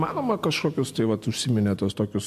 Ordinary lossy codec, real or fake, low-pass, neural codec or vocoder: MP3, 64 kbps; real; 14.4 kHz; none